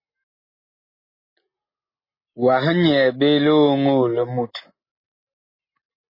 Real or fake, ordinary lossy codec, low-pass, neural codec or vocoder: real; MP3, 24 kbps; 5.4 kHz; none